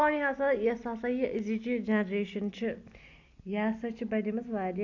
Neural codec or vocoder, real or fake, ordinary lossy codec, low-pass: none; real; AAC, 48 kbps; 7.2 kHz